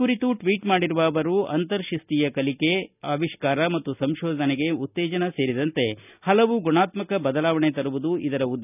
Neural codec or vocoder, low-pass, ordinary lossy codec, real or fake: none; 3.6 kHz; none; real